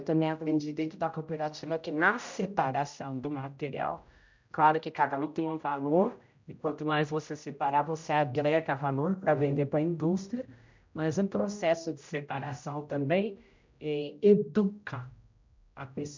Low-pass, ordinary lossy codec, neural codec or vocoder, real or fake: 7.2 kHz; MP3, 64 kbps; codec, 16 kHz, 0.5 kbps, X-Codec, HuBERT features, trained on general audio; fake